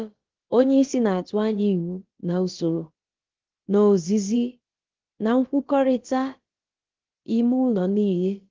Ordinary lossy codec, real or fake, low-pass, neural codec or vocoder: Opus, 16 kbps; fake; 7.2 kHz; codec, 16 kHz, about 1 kbps, DyCAST, with the encoder's durations